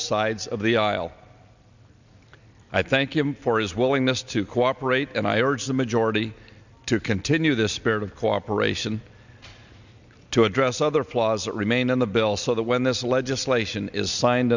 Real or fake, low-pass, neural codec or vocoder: real; 7.2 kHz; none